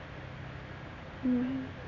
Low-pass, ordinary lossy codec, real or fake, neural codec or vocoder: 7.2 kHz; none; real; none